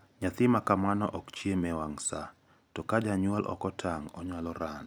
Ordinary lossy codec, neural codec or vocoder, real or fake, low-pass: none; none; real; none